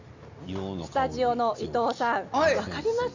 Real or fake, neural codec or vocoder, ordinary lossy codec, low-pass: real; none; Opus, 64 kbps; 7.2 kHz